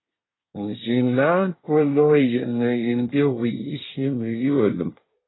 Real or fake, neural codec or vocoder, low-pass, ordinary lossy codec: fake; codec, 24 kHz, 1 kbps, SNAC; 7.2 kHz; AAC, 16 kbps